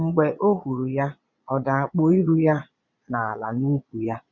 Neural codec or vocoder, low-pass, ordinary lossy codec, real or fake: vocoder, 22.05 kHz, 80 mel bands, Vocos; 7.2 kHz; none; fake